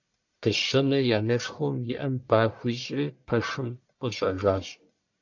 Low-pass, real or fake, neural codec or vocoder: 7.2 kHz; fake; codec, 44.1 kHz, 1.7 kbps, Pupu-Codec